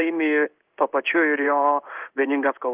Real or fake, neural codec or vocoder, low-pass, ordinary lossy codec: fake; codec, 16 kHz in and 24 kHz out, 1 kbps, XY-Tokenizer; 3.6 kHz; Opus, 32 kbps